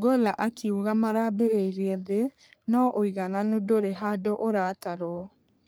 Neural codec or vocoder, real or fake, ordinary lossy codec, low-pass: codec, 44.1 kHz, 3.4 kbps, Pupu-Codec; fake; none; none